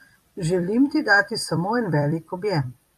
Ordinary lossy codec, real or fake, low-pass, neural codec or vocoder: AAC, 96 kbps; fake; 14.4 kHz; vocoder, 44.1 kHz, 128 mel bands every 256 samples, BigVGAN v2